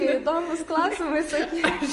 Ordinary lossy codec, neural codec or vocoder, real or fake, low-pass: MP3, 48 kbps; none; real; 14.4 kHz